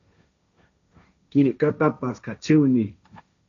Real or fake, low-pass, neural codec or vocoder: fake; 7.2 kHz; codec, 16 kHz, 1.1 kbps, Voila-Tokenizer